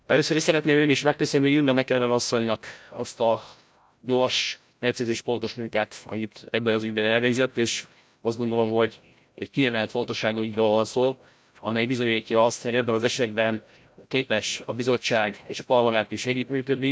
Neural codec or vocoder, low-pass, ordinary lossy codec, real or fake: codec, 16 kHz, 0.5 kbps, FreqCodec, larger model; none; none; fake